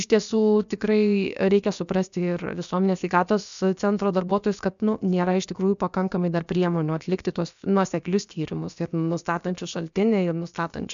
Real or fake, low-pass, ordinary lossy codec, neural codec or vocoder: fake; 7.2 kHz; MP3, 96 kbps; codec, 16 kHz, about 1 kbps, DyCAST, with the encoder's durations